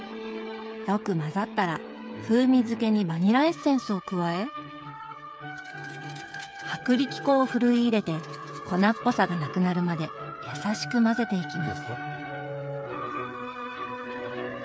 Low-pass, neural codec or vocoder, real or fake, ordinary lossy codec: none; codec, 16 kHz, 8 kbps, FreqCodec, smaller model; fake; none